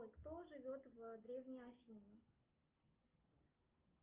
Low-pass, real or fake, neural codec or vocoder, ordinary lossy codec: 3.6 kHz; real; none; Opus, 24 kbps